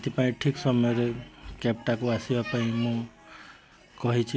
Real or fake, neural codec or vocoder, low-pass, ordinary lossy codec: real; none; none; none